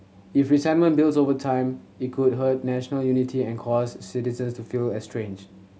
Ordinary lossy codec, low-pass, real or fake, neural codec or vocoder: none; none; real; none